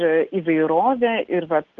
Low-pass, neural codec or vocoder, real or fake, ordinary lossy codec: 7.2 kHz; none; real; Opus, 16 kbps